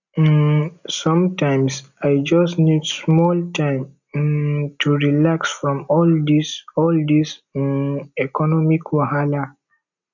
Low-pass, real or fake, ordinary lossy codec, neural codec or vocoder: 7.2 kHz; real; none; none